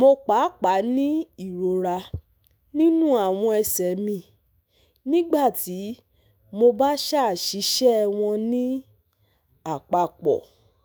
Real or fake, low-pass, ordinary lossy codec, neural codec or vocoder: fake; none; none; autoencoder, 48 kHz, 128 numbers a frame, DAC-VAE, trained on Japanese speech